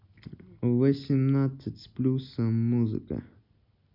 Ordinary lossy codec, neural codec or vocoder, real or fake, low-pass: none; none; real; 5.4 kHz